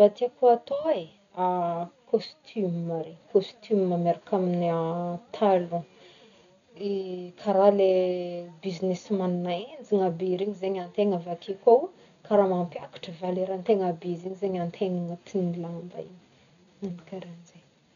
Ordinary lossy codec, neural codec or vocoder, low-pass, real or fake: none; none; 7.2 kHz; real